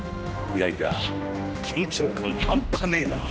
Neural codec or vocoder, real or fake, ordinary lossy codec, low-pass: codec, 16 kHz, 1 kbps, X-Codec, HuBERT features, trained on general audio; fake; none; none